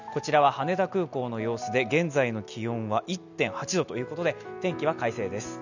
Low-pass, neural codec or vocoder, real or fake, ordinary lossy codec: 7.2 kHz; none; real; none